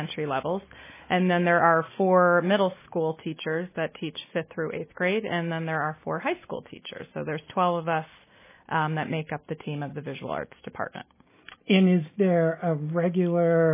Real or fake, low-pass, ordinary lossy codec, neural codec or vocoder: real; 3.6 kHz; MP3, 16 kbps; none